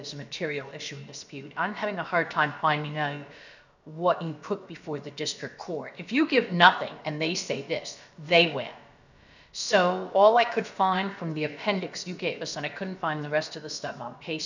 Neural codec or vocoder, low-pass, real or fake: codec, 16 kHz, about 1 kbps, DyCAST, with the encoder's durations; 7.2 kHz; fake